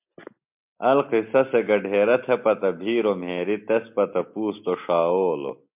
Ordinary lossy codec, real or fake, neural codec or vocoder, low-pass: MP3, 32 kbps; real; none; 3.6 kHz